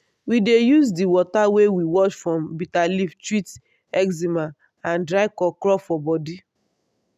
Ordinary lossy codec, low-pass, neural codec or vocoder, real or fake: none; 14.4 kHz; none; real